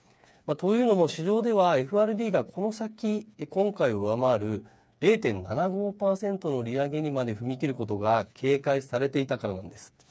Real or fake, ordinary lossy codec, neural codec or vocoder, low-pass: fake; none; codec, 16 kHz, 4 kbps, FreqCodec, smaller model; none